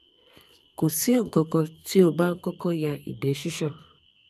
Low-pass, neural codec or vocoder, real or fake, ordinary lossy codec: 14.4 kHz; codec, 44.1 kHz, 2.6 kbps, SNAC; fake; none